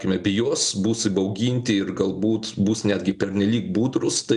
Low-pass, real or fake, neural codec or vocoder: 10.8 kHz; real; none